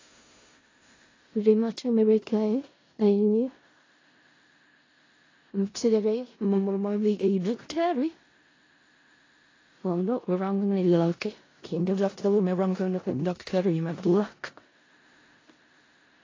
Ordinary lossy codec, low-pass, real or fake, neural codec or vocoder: AAC, 32 kbps; 7.2 kHz; fake; codec, 16 kHz in and 24 kHz out, 0.4 kbps, LongCat-Audio-Codec, four codebook decoder